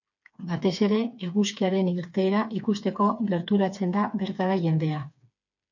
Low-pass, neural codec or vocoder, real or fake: 7.2 kHz; codec, 16 kHz, 4 kbps, FreqCodec, smaller model; fake